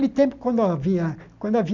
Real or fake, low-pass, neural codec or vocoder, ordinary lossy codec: real; 7.2 kHz; none; none